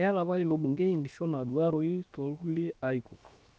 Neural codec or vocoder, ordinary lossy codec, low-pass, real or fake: codec, 16 kHz, 0.7 kbps, FocalCodec; none; none; fake